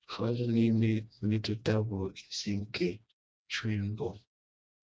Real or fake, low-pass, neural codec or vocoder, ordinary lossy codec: fake; none; codec, 16 kHz, 1 kbps, FreqCodec, smaller model; none